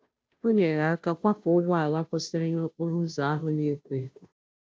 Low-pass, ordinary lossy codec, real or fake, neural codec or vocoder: none; none; fake; codec, 16 kHz, 0.5 kbps, FunCodec, trained on Chinese and English, 25 frames a second